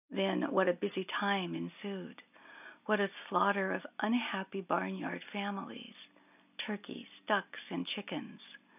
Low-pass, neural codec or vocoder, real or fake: 3.6 kHz; none; real